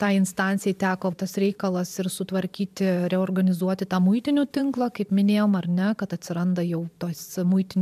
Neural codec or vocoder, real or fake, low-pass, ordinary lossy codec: vocoder, 44.1 kHz, 128 mel bands every 512 samples, BigVGAN v2; fake; 14.4 kHz; MP3, 96 kbps